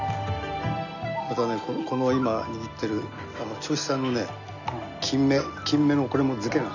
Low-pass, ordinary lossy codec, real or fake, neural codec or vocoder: 7.2 kHz; none; real; none